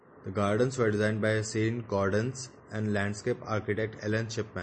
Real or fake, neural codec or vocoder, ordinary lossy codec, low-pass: real; none; MP3, 32 kbps; 10.8 kHz